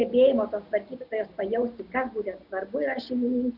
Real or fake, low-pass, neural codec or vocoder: real; 5.4 kHz; none